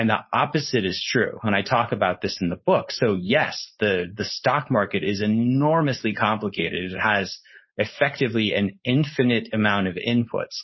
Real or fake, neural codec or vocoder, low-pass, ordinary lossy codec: fake; codec, 16 kHz, 4.8 kbps, FACodec; 7.2 kHz; MP3, 24 kbps